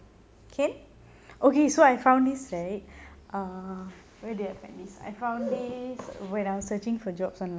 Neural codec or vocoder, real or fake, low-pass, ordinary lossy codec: none; real; none; none